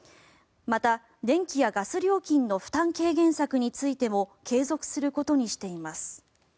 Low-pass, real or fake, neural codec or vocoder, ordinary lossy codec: none; real; none; none